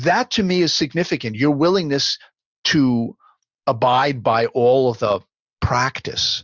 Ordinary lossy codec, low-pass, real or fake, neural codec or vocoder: Opus, 64 kbps; 7.2 kHz; real; none